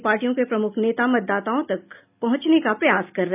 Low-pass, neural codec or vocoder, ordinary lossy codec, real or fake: 3.6 kHz; none; none; real